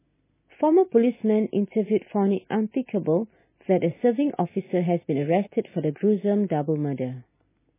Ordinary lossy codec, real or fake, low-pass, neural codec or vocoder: MP3, 16 kbps; real; 3.6 kHz; none